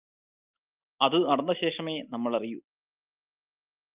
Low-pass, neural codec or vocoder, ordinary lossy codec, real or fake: 3.6 kHz; none; Opus, 32 kbps; real